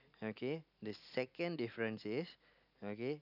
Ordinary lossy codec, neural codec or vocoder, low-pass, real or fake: none; none; 5.4 kHz; real